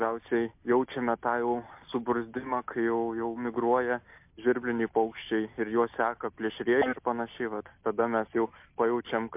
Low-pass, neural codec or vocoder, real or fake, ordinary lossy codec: 3.6 kHz; none; real; MP3, 32 kbps